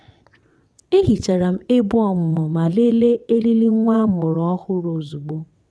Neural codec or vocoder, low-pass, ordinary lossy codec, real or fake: vocoder, 22.05 kHz, 80 mel bands, WaveNeXt; none; none; fake